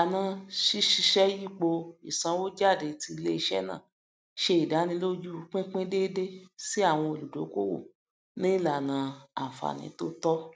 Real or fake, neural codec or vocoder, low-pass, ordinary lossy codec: real; none; none; none